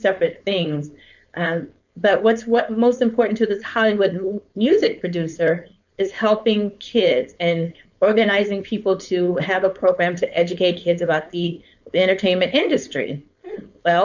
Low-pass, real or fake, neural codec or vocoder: 7.2 kHz; fake; codec, 16 kHz, 4.8 kbps, FACodec